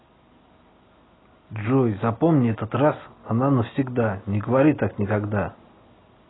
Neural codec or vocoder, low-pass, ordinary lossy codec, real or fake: none; 7.2 kHz; AAC, 16 kbps; real